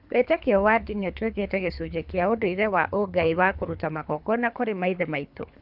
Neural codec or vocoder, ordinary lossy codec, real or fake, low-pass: codec, 24 kHz, 3 kbps, HILCodec; none; fake; 5.4 kHz